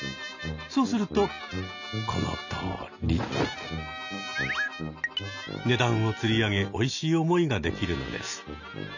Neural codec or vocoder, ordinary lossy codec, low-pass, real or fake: none; none; 7.2 kHz; real